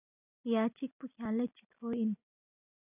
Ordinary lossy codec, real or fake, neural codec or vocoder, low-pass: AAC, 32 kbps; real; none; 3.6 kHz